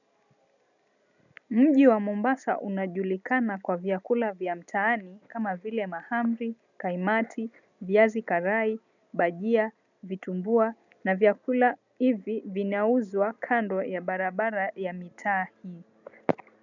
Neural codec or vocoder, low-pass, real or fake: none; 7.2 kHz; real